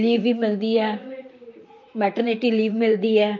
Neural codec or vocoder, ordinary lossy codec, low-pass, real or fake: vocoder, 44.1 kHz, 128 mel bands, Pupu-Vocoder; MP3, 48 kbps; 7.2 kHz; fake